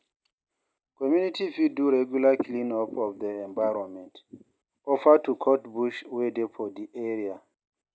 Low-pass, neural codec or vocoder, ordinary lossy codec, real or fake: none; none; none; real